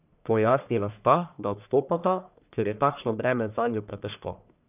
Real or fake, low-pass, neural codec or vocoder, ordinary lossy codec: fake; 3.6 kHz; codec, 44.1 kHz, 1.7 kbps, Pupu-Codec; none